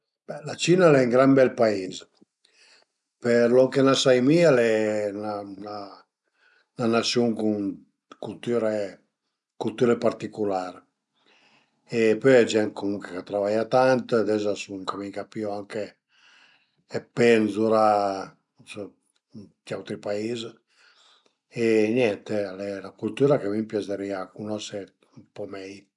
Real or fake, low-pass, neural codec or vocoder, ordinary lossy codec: real; 10.8 kHz; none; none